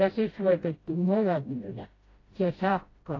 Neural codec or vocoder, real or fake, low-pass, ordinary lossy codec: codec, 16 kHz, 0.5 kbps, FreqCodec, smaller model; fake; 7.2 kHz; AAC, 32 kbps